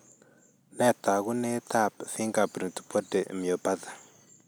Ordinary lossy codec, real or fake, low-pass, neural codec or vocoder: none; real; none; none